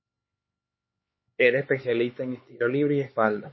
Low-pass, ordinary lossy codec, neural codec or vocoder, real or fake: 7.2 kHz; MP3, 24 kbps; codec, 16 kHz, 4 kbps, X-Codec, HuBERT features, trained on LibriSpeech; fake